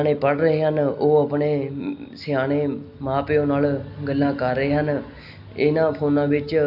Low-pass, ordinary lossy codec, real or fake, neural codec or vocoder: 5.4 kHz; AAC, 48 kbps; real; none